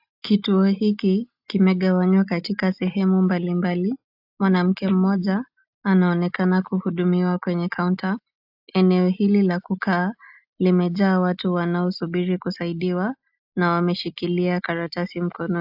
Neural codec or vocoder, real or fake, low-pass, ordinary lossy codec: none; real; 5.4 kHz; AAC, 48 kbps